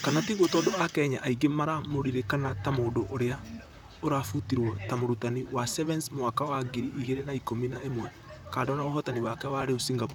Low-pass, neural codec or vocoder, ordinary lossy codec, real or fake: none; vocoder, 44.1 kHz, 128 mel bands, Pupu-Vocoder; none; fake